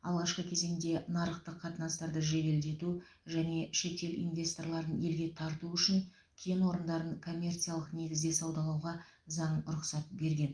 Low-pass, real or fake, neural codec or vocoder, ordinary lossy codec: 9.9 kHz; real; none; Opus, 32 kbps